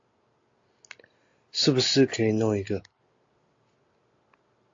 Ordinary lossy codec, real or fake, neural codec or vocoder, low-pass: AAC, 48 kbps; real; none; 7.2 kHz